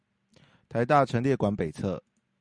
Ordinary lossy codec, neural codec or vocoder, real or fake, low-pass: Opus, 64 kbps; none; real; 9.9 kHz